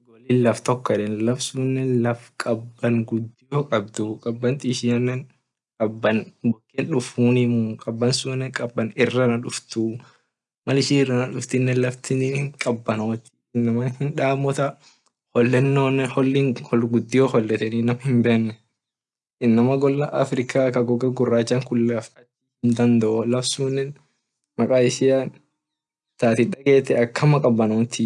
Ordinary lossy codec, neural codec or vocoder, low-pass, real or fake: none; none; 10.8 kHz; real